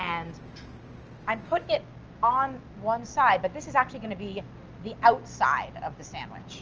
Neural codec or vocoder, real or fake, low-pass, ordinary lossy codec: none; real; 7.2 kHz; Opus, 24 kbps